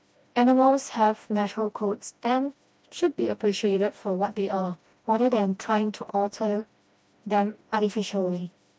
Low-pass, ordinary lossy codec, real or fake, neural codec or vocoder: none; none; fake; codec, 16 kHz, 1 kbps, FreqCodec, smaller model